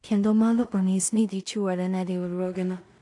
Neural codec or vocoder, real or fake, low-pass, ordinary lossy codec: codec, 16 kHz in and 24 kHz out, 0.4 kbps, LongCat-Audio-Codec, two codebook decoder; fake; 10.8 kHz; AAC, 64 kbps